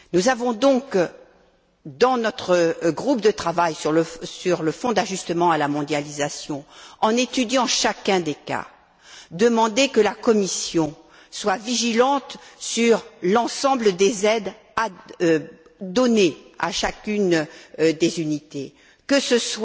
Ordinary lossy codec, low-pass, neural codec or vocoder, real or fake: none; none; none; real